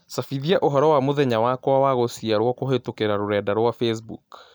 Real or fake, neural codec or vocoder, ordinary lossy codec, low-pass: real; none; none; none